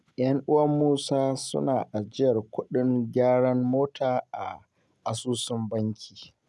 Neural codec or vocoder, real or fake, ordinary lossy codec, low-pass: none; real; none; none